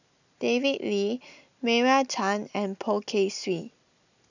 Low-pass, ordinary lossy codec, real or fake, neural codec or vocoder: 7.2 kHz; none; real; none